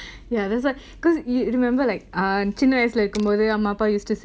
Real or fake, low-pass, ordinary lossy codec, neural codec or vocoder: real; none; none; none